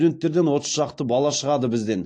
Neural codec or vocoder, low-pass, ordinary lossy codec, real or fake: none; 9.9 kHz; AAC, 48 kbps; real